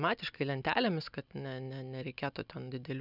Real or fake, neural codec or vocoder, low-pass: real; none; 5.4 kHz